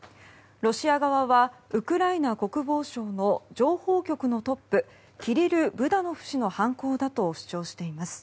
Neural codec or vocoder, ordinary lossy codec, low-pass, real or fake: none; none; none; real